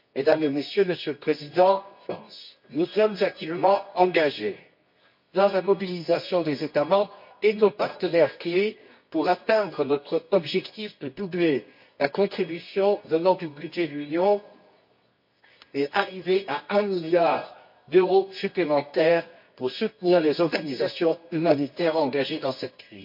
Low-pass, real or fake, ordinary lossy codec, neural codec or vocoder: 5.4 kHz; fake; MP3, 32 kbps; codec, 24 kHz, 0.9 kbps, WavTokenizer, medium music audio release